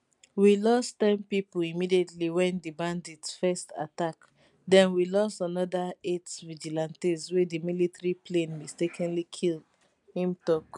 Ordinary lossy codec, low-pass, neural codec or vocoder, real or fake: none; 10.8 kHz; none; real